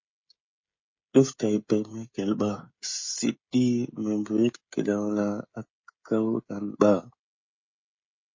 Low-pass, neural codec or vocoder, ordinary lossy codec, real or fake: 7.2 kHz; codec, 16 kHz, 16 kbps, FreqCodec, smaller model; MP3, 32 kbps; fake